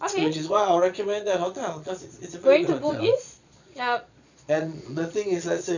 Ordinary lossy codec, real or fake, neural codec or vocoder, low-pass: none; fake; vocoder, 22.05 kHz, 80 mel bands, Vocos; 7.2 kHz